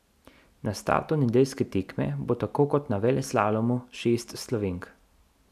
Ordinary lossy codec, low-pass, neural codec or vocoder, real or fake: none; 14.4 kHz; none; real